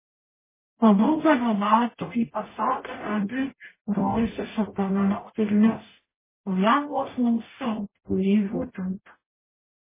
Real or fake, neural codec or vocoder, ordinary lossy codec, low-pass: fake; codec, 44.1 kHz, 0.9 kbps, DAC; MP3, 16 kbps; 3.6 kHz